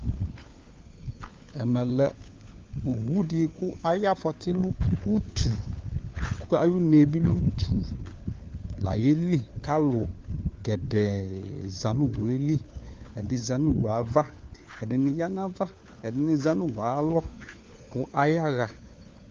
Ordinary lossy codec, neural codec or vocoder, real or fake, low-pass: Opus, 24 kbps; codec, 16 kHz, 4 kbps, FunCodec, trained on LibriTTS, 50 frames a second; fake; 7.2 kHz